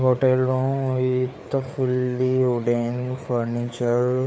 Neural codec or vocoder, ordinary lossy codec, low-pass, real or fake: codec, 16 kHz, 4 kbps, FreqCodec, larger model; none; none; fake